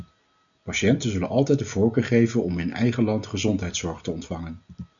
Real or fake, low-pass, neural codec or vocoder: real; 7.2 kHz; none